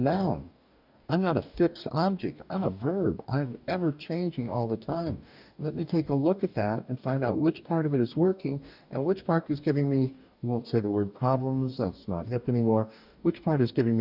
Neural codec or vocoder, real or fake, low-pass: codec, 44.1 kHz, 2.6 kbps, DAC; fake; 5.4 kHz